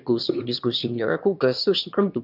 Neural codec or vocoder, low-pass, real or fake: autoencoder, 22.05 kHz, a latent of 192 numbers a frame, VITS, trained on one speaker; 5.4 kHz; fake